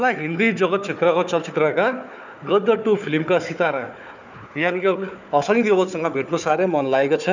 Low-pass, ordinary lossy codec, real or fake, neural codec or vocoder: 7.2 kHz; none; fake; codec, 16 kHz, 4 kbps, FunCodec, trained on Chinese and English, 50 frames a second